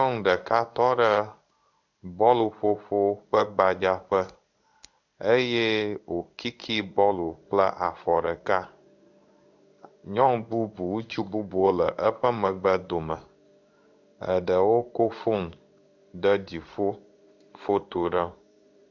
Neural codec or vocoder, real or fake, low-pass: codec, 16 kHz in and 24 kHz out, 1 kbps, XY-Tokenizer; fake; 7.2 kHz